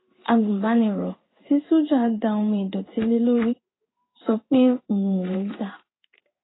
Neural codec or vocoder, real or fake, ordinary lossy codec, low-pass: codec, 16 kHz in and 24 kHz out, 1 kbps, XY-Tokenizer; fake; AAC, 16 kbps; 7.2 kHz